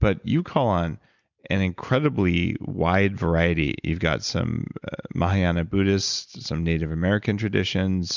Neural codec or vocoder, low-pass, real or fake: none; 7.2 kHz; real